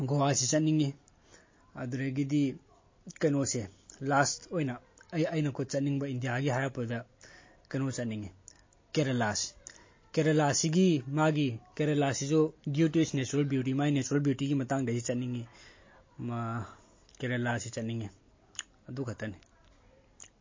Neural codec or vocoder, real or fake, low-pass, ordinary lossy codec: none; real; 7.2 kHz; MP3, 32 kbps